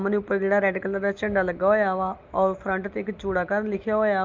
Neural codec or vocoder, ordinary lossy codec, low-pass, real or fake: none; Opus, 24 kbps; 7.2 kHz; real